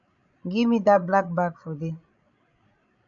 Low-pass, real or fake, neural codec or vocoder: 7.2 kHz; fake; codec, 16 kHz, 16 kbps, FreqCodec, larger model